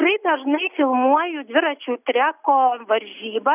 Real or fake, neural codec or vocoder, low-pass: real; none; 3.6 kHz